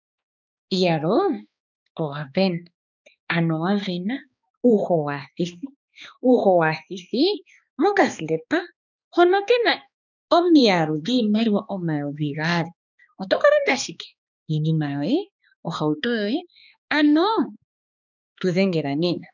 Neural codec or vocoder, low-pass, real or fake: codec, 16 kHz, 2 kbps, X-Codec, HuBERT features, trained on balanced general audio; 7.2 kHz; fake